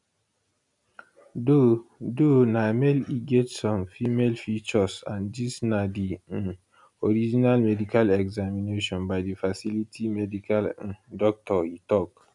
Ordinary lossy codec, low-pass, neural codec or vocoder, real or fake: none; 10.8 kHz; none; real